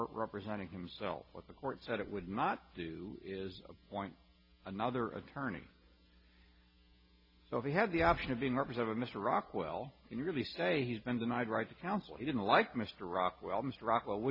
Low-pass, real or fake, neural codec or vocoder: 5.4 kHz; real; none